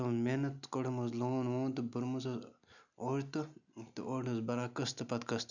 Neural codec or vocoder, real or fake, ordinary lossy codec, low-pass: none; real; none; 7.2 kHz